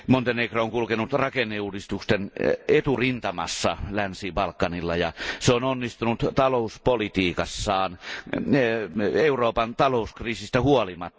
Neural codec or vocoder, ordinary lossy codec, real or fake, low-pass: none; none; real; none